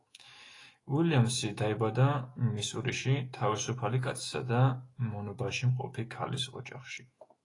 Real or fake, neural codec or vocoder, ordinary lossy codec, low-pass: fake; autoencoder, 48 kHz, 128 numbers a frame, DAC-VAE, trained on Japanese speech; AAC, 32 kbps; 10.8 kHz